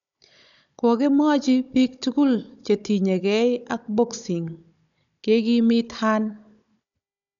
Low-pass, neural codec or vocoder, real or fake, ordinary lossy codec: 7.2 kHz; codec, 16 kHz, 16 kbps, FunCodec, trained on Chinese and English, 50 frames a second; fake; none